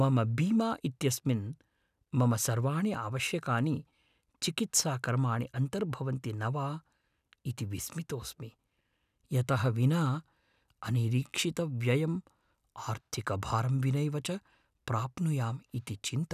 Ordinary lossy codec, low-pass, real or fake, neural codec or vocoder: none; 14.4 kHz; real; none